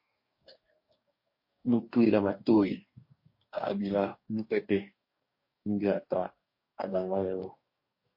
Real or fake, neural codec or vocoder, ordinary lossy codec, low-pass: fake; codec, 44.1 kHz, 2.6 kbps, DAC; MP3, 32 kbps; 5.4 kHz